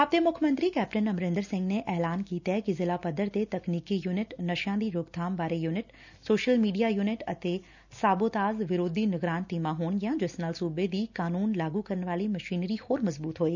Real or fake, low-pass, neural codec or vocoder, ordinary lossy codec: real; 7.2 kHz; none; none